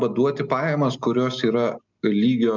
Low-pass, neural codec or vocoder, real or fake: 7.2 kHz; none; real